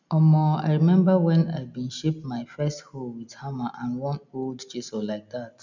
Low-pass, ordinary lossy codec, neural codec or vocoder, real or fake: 7.2 kHz; none; none; real